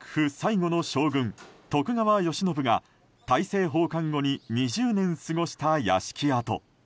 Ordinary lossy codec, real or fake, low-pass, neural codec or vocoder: none; real; none; none